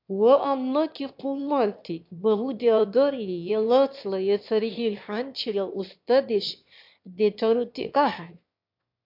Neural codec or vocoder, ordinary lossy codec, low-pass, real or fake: autoencoder, 22.05 kHz, a latent of 192 numbers a frame, VITS, trained on one speaker; MP3, 48 kbps; 5.4 kHz; fake